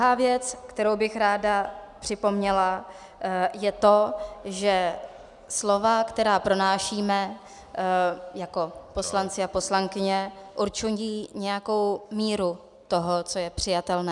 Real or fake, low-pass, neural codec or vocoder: real; 10.8 kHz; none